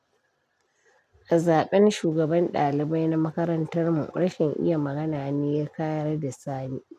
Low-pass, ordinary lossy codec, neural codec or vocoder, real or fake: 14.4 kHz; none; none; real